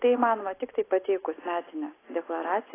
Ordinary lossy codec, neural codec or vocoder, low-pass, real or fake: AAC, 16 kbps; none; 3.6 kHz; real